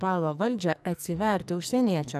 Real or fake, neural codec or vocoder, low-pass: fake; codec, 44.1 kHz, 2.6 kbps, SNAC; 14.4 kHz